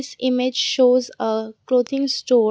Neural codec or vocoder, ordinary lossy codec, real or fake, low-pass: none; none; real; none